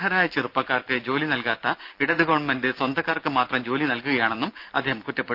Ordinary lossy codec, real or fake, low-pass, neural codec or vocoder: Opus, 32 kbps; real; 5.4 kHz; none